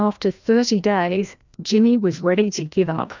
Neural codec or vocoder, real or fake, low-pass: codec, 16 kHz, 1 kbps, FreqCodec, larger model; fake; 7.2 kHz